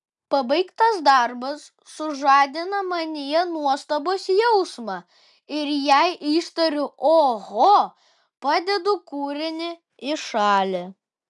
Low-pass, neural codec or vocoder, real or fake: 10.8 kHz; none; real